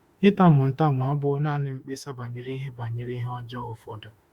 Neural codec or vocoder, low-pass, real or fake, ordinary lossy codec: autoencoder, 48 kHz, 32 numbers a frame, DAC-VAE, trained on Japanese speech; 19.8 kHz; fake; Opus, 64 kbps